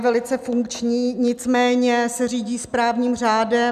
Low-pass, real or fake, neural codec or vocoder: 14.4 kHz; real; none